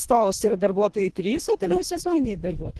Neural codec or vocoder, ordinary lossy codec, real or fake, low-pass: codec, 24 kHz, 1.5 kbps, HILCodec; Opus, 16 kbps; fake; 10.8 kHz